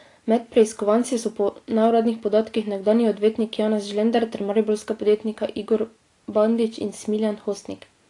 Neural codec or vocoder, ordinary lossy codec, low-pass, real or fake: none; AAC, 48 kbps; 10.8 kHz; real